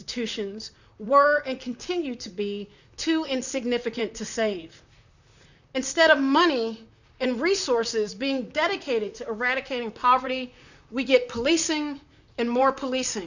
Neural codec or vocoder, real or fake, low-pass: vocoder, 44.1 kHz, 128 mel bands, Pupu-Vocoder; fake; 7.2 kHz